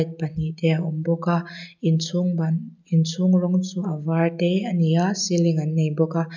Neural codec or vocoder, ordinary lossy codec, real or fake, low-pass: none; none; real; 7.2 kHz